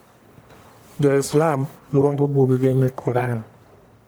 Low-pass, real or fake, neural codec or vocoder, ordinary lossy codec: none; fake; codec, 44.1 kHz, 1.7 kbps, Pupu-Codec; none